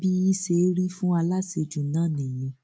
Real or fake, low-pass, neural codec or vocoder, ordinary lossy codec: real; none; none; none